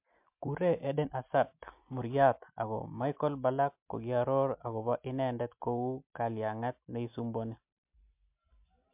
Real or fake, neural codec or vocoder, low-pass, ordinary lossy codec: real; none; 3.6 kHz; MP3, 32 kbps